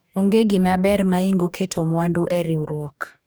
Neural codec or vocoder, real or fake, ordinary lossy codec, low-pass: codec, 44.1 kHz, 2.6 kbps, DAC; fake; none; none